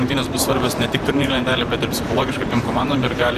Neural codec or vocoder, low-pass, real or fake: vocoder, 44.1 kHz, 128 mel bands, Pupu-Vocoder; 14.4 kHz; fake